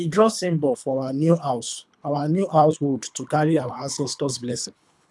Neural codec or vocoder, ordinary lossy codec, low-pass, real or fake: codec, 24 kHz, 3 kbps, HILCodec; none; none; fake